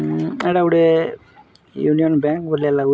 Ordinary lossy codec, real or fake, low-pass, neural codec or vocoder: none; real; none; none